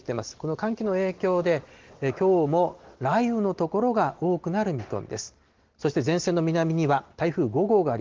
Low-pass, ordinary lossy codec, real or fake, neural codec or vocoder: 7.2 kHz; Opus, 16 kbps; real; none